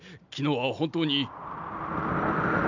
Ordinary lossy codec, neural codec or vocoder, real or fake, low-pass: none; none; real; 7.2 kHz